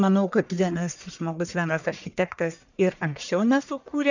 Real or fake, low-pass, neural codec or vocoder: fake; 7.2 kHz; codec, 44.1 kHz, 1.7 kbps, Pupu-Codec